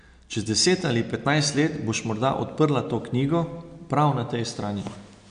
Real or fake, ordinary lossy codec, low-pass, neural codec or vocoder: real; MP3, 64 kbps; 9.9 kHz; none